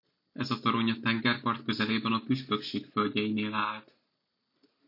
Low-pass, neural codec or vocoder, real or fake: 5.4 kHz; none; real